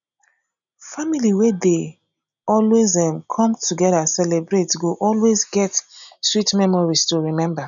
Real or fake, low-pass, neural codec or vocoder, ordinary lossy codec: real; 7.2 kHz; none; none